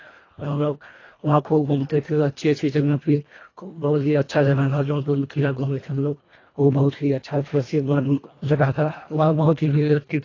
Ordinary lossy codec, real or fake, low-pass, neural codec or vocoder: AAC, 32 kbps; fake; 7.2 kHz; codec, 24 kHz, 1.5 kbps, HILCodec